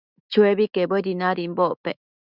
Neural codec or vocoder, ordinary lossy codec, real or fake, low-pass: autoencoder, 48 kHz, 128 numbers a frame, DAC-VAE, trained on Japanese speech; Opus, 64 kbps; fake; 5.4 kHz